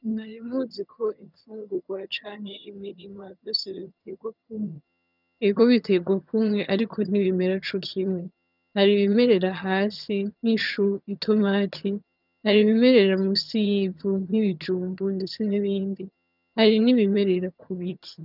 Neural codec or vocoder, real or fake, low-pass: vocoder, 22.05 kHz, 80 mel bands, HiFi-GAN; fake; 5.4 kHz